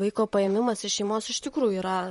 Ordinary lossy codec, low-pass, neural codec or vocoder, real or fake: MP3, 48 kbps; 19.8 kHz; vocoder, 44.1 kHz, 128 mel bands, Pupu-Vocoder; fake